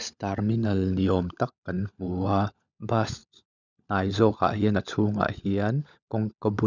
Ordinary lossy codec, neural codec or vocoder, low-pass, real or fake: none; codec, 16 kHz, 16 kbps, FunCodec, trained on LibriTTS, 50 frames a second; 7.2 kHz; fake